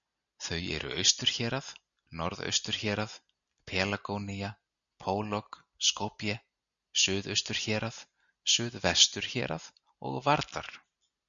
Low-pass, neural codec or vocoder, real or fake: 7.2 kHz; none; real